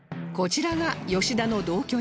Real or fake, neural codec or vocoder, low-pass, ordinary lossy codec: real; none; none; none